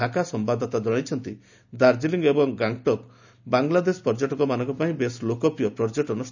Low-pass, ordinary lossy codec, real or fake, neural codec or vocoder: 7.2 kHz; none; real; none